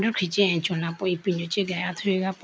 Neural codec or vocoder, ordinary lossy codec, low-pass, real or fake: none; none; none; real